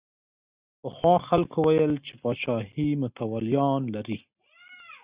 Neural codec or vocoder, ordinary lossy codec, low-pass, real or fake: none; Opus, 64 kbps; 3.6 kHz; real